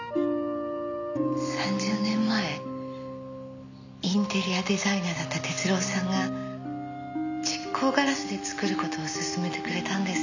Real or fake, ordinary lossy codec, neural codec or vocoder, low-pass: real; none; none; 7.2 kHz